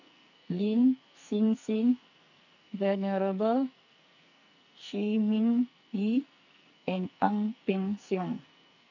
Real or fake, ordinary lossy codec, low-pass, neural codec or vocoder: fake; none; 7.2 kHz; codec, 44.1 kHz, 2.6 kbps, SNAC